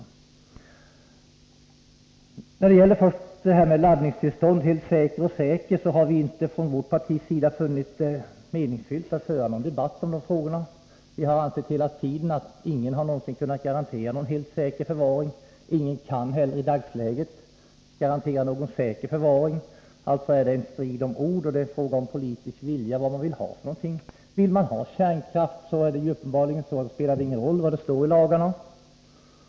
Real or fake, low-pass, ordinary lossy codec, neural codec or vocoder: real; none; none; none